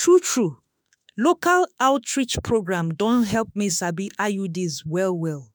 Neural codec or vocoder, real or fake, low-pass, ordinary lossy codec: autoencoder, 48 kHz, 32 numbers a frame, DAC-VAE, trained on Japanese speech; fake; none; none